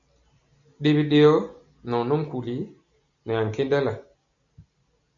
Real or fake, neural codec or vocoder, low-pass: real; none; 7.2 kHz